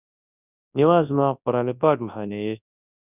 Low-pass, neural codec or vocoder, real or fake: 3.6 kHz; codec, 24 kHz, 0.9 kbps, WavTokenizer, large speech release; fake